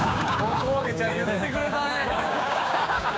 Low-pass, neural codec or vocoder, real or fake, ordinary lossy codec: none; codec, 16 kHz, 6 kbps, DAC; fake; none